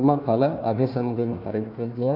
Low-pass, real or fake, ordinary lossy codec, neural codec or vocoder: 5.4 kHz; fake; none; codec, 16 kHz, 1 kbps, FunCodec, trained on Chinese and English, 50 frames a second